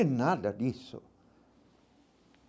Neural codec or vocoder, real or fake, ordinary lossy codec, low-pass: none; real; none; none